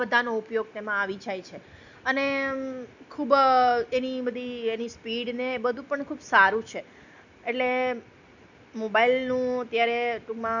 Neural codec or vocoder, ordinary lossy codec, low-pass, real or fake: none; none; 7.2 kHz; real